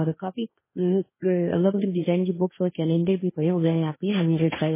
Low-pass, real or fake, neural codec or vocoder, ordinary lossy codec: 3.6 kHz; fake; codec, 16 kHz, 1.1 kbps, Voila-Tokenizer; MP3, 16 kbps